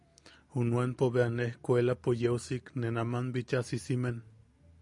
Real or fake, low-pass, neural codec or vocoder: real; 10.8 kHz; none